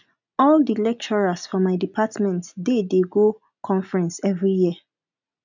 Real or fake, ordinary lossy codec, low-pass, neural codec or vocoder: real; none; 7.2 kHz; none